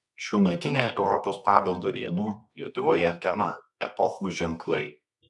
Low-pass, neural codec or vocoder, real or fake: 10.8 kHz; codec, 24 kHz, 0.9 kbps, WavTokenizer, medium music audio release; fake